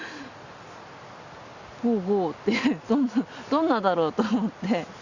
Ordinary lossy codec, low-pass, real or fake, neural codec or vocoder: Opus, 64 kbps; 7.2 kHz; real; none